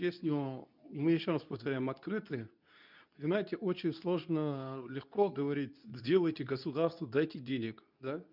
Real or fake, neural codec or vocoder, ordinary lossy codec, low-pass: fake; codec, 24 kHz, 0.9 kbps, WavTokenizer, medium speech release version 2; none; 5.4 kHz